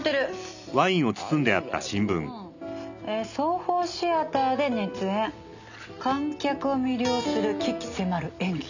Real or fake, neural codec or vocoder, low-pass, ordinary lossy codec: real; none; 7.2 kHz; none